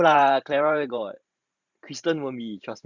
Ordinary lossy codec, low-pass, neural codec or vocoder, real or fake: Opus, 64 kbps; 7.2 kHz; vocoder, 44.1 kHz, 128 mel bands every 512 samples, BigVGAN v2; fake